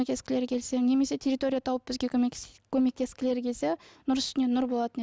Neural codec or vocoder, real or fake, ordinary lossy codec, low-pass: none; real; none; none